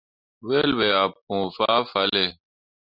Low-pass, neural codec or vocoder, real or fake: 5.4 kHz; none; real